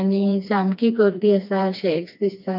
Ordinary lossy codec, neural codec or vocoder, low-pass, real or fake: none; codec, 16 kHz, 2 kbps, FreqCodec, smaller model; 5.4 kHz; fake